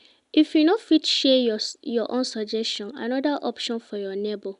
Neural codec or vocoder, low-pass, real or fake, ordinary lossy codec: none; 10.8 kHz; real; none